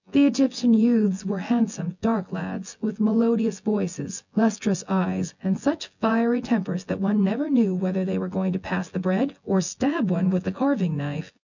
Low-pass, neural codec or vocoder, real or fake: 7.2 kHz; vocoder, 24 kHz, 100 mel bands, Vocos; fake